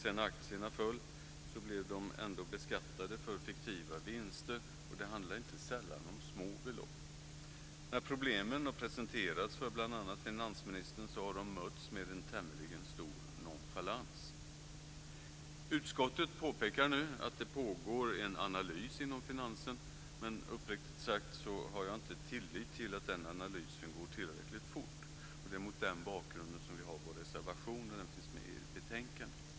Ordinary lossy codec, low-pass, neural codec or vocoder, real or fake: none; none; none; real